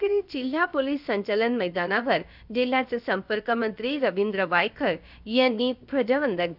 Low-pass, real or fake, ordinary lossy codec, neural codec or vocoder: 5.4 kHz; fake; none; codec, 16 kHz, about 1 kbps, DyCAST, with the encoder's durations